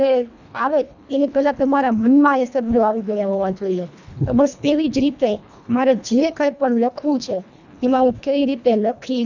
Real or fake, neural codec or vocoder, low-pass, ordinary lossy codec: fake; codec, 24 kHz, 1.5 kbps, HILCodec; 7.2 kHz; none